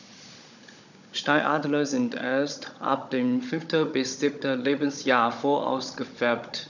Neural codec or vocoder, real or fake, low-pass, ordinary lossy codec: codec, 16 kHz, 16 kbps, FunCodec, trained on Chinese and English, 50 frames a second; fake; 7.2 kHz; none